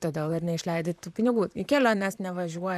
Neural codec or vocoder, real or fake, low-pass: vocoder, 44.1 kHz, 128 mel bands, Pupu-Vocoder; fake; 14.4 kHz